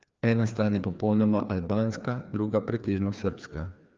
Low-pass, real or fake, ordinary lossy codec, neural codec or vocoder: 7.2 kHz; fake; Opus, 24 kbps; codec, 16 kHz, 2 kbps, FreqCodec, larger model